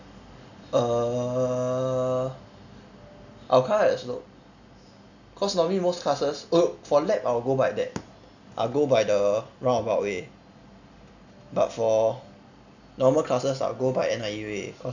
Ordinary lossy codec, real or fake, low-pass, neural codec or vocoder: none; real; 7.2 kHz; none